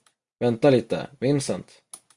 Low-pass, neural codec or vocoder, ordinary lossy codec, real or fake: 10.8 kHz; none; Opus, 64 kbps; real